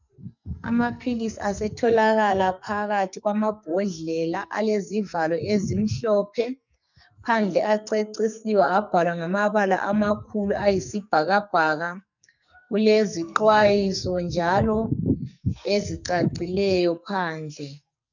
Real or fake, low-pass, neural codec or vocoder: fake; 7.2 kHz; codec, 44.1 kHz, 2.6 kbps, SNAC